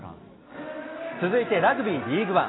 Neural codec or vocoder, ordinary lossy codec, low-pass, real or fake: none; AAC, 16 kbps; 7.2 kHz; real